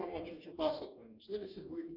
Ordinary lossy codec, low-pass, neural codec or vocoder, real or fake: AAC, 48 kbps; 5.4 kHz; codec, 44.1 kHz, 2.6 kbps, DAC; fake